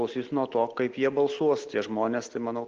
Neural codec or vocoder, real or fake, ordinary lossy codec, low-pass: none; real; Opus, 16 kbps; 7.2 kHz